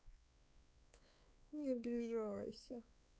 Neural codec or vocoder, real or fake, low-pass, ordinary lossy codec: codec, 16 kHz, 4 kbps, X-Codec, WavLM features, trained on Multilingual LibriSpeech; fake; none; none